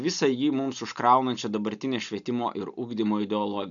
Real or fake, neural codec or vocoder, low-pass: real; none; 7.2 kHz